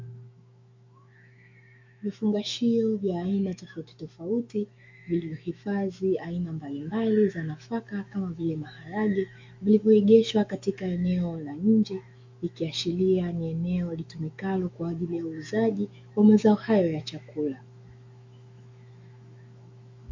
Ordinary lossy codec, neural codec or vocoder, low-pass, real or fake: MP3, 48 kbps; autoencoder, 48 kHz, 128 numbers a frame, DAC-VAE, trained on Japanese speech; 7.2 kHz; fake